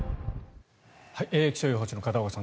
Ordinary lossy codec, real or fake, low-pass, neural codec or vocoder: none; real; none; none